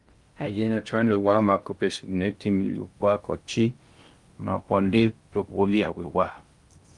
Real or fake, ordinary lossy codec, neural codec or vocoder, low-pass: fake; Opus, 32 kbps; codec, 16 kHz in and 24 kHz out, 0.6 kbps, FocalCodec, streaming, 2048 codes; 10.8 kHz